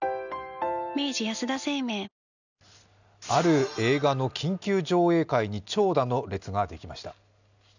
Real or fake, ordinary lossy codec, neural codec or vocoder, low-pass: real; none; none; 7.2 kHz